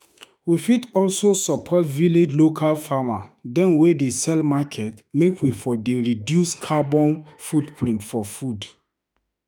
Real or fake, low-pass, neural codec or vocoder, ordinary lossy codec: fake; none; autoencoder, 48 kHz, 32 numbers a frame, DAC-VAE, trained on Japanese speech; none